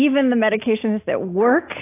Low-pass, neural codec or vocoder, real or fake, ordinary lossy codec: 3.6 kHz; none; real; AAC, 24 kbps